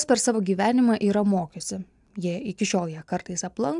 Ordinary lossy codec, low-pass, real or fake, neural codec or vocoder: MP3, 96 kbps; 10.8 kHz; real; none